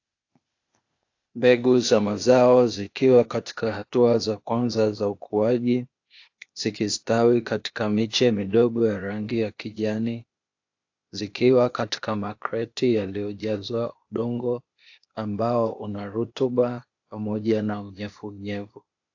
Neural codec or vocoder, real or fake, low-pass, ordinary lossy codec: codec, 16 kHz, 0.8 kbps, ZipCodec; fake; 7.2 kHz; AAC, 48 kbps